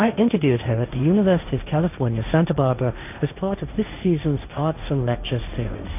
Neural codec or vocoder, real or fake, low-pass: codec, 16 kHz, 1.1 kbps, Voila-Tokenizer; fake; 3.6 kHz